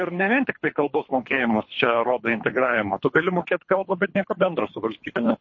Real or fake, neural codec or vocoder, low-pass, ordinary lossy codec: fake; codec, 24 kHz, 3 kbps, HILCodec; 7.2 kHz; MP3, 32 kbps